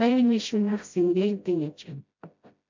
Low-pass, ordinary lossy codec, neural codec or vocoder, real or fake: 7.2 kHz; MP3, 64 kbps; codec, 16 kHz, 0.5 kbps, FreqCodec, smaller model; fake